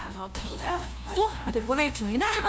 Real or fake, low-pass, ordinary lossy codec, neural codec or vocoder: fake; none; none; codec, 16 kHz, 0.5 kbps, FunCodec, trained on LibriTTS, 25 frames a second